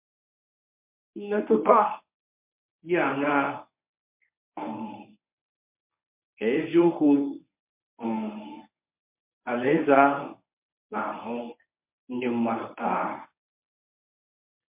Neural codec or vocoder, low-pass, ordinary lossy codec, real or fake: codec, 24 kHz, 0.9 kbps, WavTokenizer, medium speech release version 1; 3.6 kHz; MP3, 32 kbps; fake